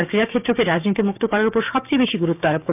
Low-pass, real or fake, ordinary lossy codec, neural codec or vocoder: 3.6 kHz; fake; none; codec, 16 kHz, 6 kbps, DAC